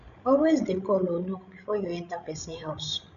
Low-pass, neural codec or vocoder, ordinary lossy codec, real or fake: 7.2 kHz; codec, 16 kHz, 16 kbps, FreqCodec, larger model; none; fake